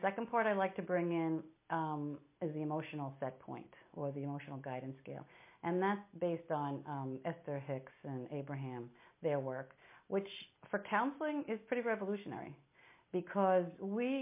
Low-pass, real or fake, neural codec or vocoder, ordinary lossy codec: 3.6 kHz; real; none; MP3, 24 kbps